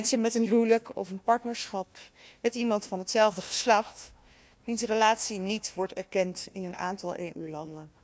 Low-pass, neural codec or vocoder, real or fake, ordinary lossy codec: none; codec, 16 kHz, 1 kbps, FunCodec, trained on Chinese and English, 50 frames a second; fake; none